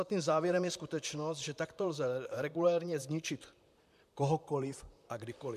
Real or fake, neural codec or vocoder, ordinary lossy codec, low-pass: fake; vocoder, 44.1 kHz, 128 mel bands, Pupu-Vocoder; MP3, 96 kbps; 14.4 kHz